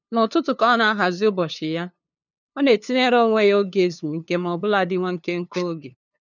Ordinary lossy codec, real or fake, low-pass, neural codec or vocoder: none; fake; 7.2 kHz; codec, 16 kHz, 2 kbps, FunCodec, trained on LibriTTS, 25 frames a second